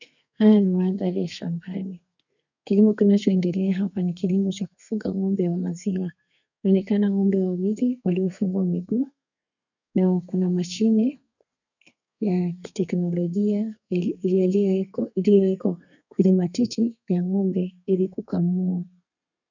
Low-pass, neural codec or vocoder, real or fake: 7.2 kHz; codec, 32 kHz, 1.9 kbps, SNAC; fake